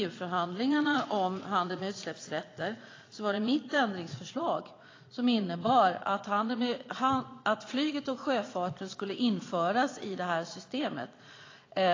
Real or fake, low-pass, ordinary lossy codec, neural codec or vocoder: fake; 7.2 kHz; AAC, 32 kbps; vocoder, 22.05 kHz, 80 mel bands, Vocos